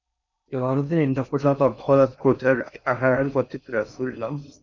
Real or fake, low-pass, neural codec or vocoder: fake; 7.2 kHz; codec, 16 kHz in and 24 kHz out, 0.6 kbps, FocalCodec, streaming, 4096 codes